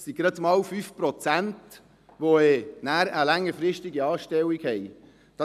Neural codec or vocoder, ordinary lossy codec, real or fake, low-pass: none; none; real; 14.4 kHz